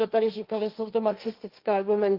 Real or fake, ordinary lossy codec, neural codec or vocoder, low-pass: fake; Opus, 32 kbps; codec, 16 kHz, 1.1 kbps, Voila-Tokenizer; 5.4 kHz